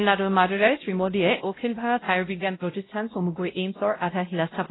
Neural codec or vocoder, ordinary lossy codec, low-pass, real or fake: codec, 16 kHz, 0.5 kbps, X-Codec, WavLM features, trained on Multilingual LibriSpeech; AAC, 16 kbps; 7.2 kHz; fake